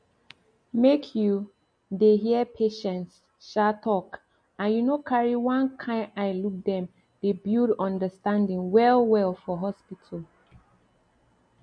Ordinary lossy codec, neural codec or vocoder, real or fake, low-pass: MP3, 48 kbps; none; real; 9.9 kHz